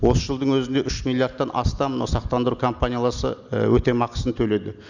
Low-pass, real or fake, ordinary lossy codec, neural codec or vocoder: 7.2 kHz; real; none; none